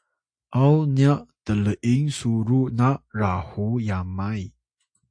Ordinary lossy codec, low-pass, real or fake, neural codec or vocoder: AAC, 64 kbps; 9.9 kHz; real; none